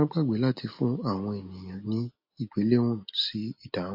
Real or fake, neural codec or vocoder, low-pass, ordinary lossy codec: real; none; 5.4 kHz; MP3, 32 kbps